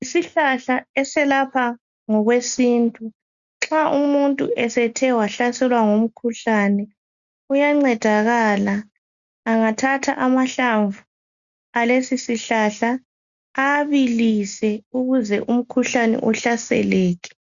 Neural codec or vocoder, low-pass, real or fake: none; 7.2 kHz; real